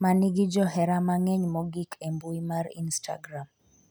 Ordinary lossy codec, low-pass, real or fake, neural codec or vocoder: none; none; real; none